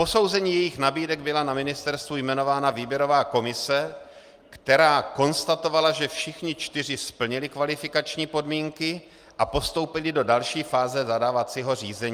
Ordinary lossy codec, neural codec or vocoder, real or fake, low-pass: Opus, 32 kbps; none; real; 14.4 kHz